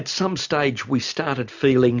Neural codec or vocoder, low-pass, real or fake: none; 7.2 kHz; real